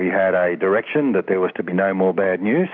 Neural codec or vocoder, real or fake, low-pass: none; real; 7.2 kHz